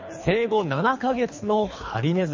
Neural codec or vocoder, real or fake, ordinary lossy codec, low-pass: codec, 24 kHz, 3 kbps, HILCodec; fake; MP3, 32 kbps; 7.2 kHz